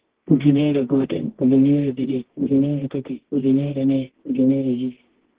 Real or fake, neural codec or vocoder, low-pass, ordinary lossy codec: fake; codec, 24 kHz, 0.9 kbps, WavTokenizer, medium music audio release; 3.6 kHz; Opus, 16 kbps